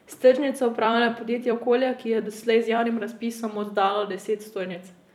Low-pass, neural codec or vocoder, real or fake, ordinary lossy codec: 19.8 kHz; vocoder, 44.1 kHz, 128 mel bands every 256 samples, BigVGAN v2; fake; none